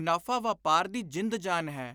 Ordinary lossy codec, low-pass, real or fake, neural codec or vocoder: none; none; real; none